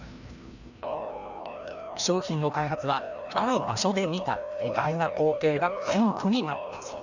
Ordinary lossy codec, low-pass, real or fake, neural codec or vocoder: none; 7.2 kHz; fake; codec, 16 kHz, 1 kbps, FreqCodec, larger model